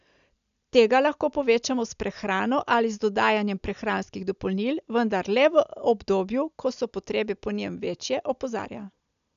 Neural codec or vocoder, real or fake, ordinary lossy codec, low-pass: none; real; none; 7.2 kHz